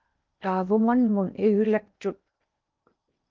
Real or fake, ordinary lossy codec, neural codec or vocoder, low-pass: fake; Opus, 32 kbps; codec, 16 kHz in and 24 kHz out, 0.8 kbps, FocalCodec, streaming, 65536 codes; 7.2 kHz